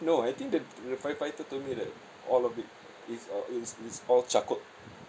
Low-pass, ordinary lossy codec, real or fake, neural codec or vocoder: none; none; real; none